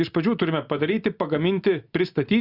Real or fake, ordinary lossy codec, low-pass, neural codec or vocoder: real; Opus, 64 kbps; 5.4 kHz; none